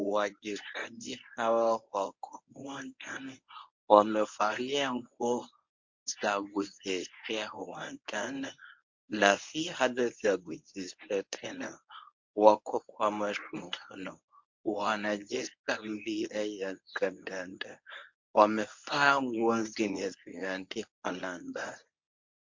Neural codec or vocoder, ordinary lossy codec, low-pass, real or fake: codec, 24 kHz, 0.9 kbps, WavTokenizer, medium speech release version 1; MP3, 48 kbps; 7.2 kHz; fake